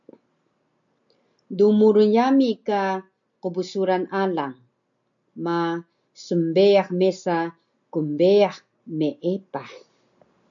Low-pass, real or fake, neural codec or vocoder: 7.2 kHz; real; none